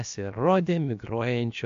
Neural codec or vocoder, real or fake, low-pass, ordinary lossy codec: codec, 16 kHz, about 1 kbps, DyCAST, with the encoder's durations; fake; 7.2 kHz; MP3, 48 kbps